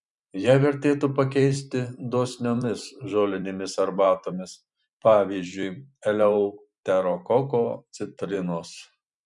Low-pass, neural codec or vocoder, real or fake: 10.8 kHz; vocoder, 44.1 kHz, 128 mel bands every 512 samples, BigVGAN v2; fake